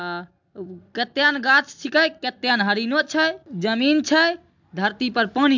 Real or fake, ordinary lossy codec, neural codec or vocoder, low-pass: real; MP3, 64 kbps; none; 7.2 kHz